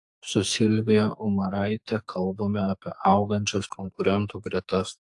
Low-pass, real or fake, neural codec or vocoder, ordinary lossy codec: 10.8 kHz; fake; codec, 44.1 kHz, 2.6 kbps, SNAC; AAC, 64 kbps